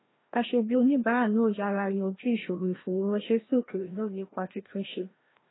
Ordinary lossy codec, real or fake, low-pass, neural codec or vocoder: AAC, 16 kbps; fake; 7.2 kHz; codec, 16 kHz, 1 kbps, FreqCodec, larger model